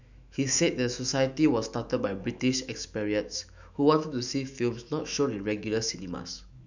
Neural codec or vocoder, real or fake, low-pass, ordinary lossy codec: autoencoder, 48 kHz, 128 numbers a frame, DAC-VAE, trained on Japanese speech; fake; 7.2 kHz; none